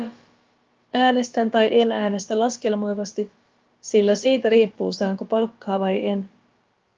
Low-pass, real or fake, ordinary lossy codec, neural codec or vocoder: 7.2 kHz; fake; Opus, 32 kbps; codec, 16 kHz, about 1 kbps, DyCAST, with the encoder's durations